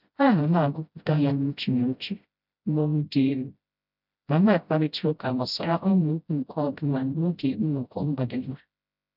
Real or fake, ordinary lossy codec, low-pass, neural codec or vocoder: fake; none; 5.4 kHz; codec, 16 kHz, 0.5 kbps, FreqCodec, smaller model